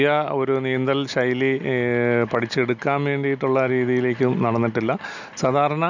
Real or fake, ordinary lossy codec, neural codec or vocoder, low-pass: real; none; none; 7.2 kHz